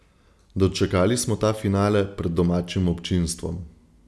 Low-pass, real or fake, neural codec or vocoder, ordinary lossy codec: none; real; none; none